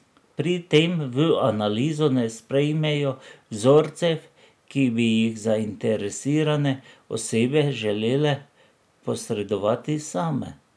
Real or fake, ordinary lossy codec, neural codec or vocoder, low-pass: real; none; none; none